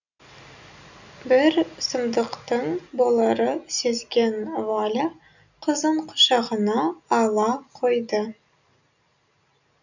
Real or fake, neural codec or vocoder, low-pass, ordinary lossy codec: real; none; 7.2 kHz; none